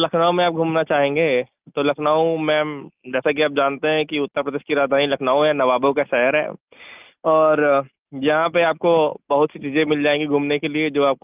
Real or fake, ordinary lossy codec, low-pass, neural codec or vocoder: real; Opus, 24 kbps; 3.6 kHz; none